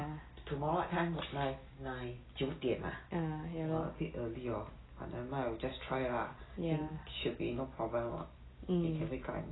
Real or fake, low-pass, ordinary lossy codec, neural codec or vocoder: real; 7.2 kHz; AAC, 16 kbps; none